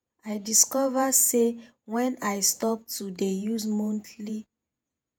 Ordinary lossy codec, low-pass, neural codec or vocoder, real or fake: none; none; vocoder, 48 kHz, 128 mel bands, Vocos; fake